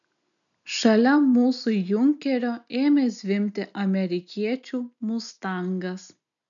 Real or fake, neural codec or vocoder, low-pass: real; none; 7.2 kHz